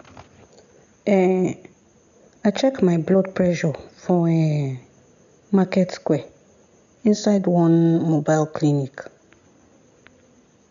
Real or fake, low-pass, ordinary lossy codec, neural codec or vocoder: real; 7.2 kHz; none; none